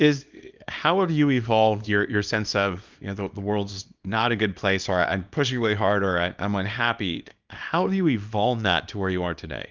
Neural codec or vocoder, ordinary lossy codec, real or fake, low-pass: codec, 24 kHz, 0.9 kbps, WavTokenizer, small release; Opus, 24 kbps; fake; 7.2 kHz